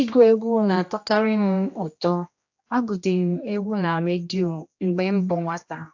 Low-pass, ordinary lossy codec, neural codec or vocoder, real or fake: 7.2 kHz; AAC, 48 kbps; codec, 16 kHz, 1 kbps, X-Codec, HuBERT features, trained on general audio; fake